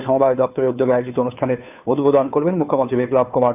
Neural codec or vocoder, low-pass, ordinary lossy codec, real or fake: codec, 16 kHz, 2 kbps, FunCodec, trained on Chinese and English, 25 frames a second; 3.6 kHz; none; fake